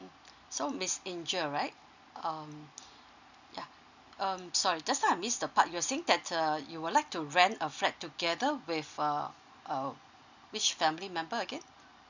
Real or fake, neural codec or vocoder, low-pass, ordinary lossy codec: real; none; 7.2 kHz; none